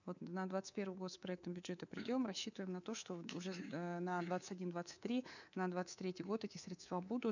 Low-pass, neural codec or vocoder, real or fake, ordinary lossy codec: 7.2 kHz; codec, 24 kHz, 3.1 kbps, DualCodec; fake; none